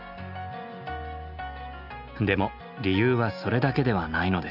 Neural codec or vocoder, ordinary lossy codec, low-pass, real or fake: none; none; 5.4 kHz; real